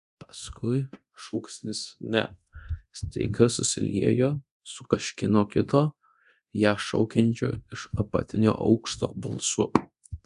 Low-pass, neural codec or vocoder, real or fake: 10.8 kHz; codec, 24 kHz, 0.9 kbps, DualCodec; fake